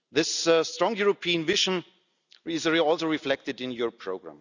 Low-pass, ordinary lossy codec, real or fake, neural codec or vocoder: 7.2 kHz; none; real; none